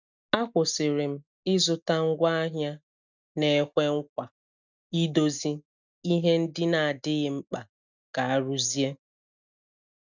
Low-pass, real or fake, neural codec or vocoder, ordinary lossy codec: 7.2 kHz; real; none; none